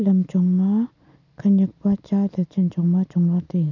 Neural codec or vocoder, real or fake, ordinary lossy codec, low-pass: none; real; none; 7.2 kHz